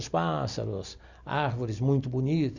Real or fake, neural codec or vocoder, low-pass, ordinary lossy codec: real; none; 7.2 kHz; none